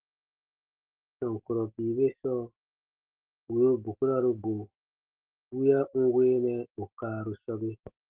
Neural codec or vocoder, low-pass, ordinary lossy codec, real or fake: none; 3.6 kHz; Opus, 16 kbps; real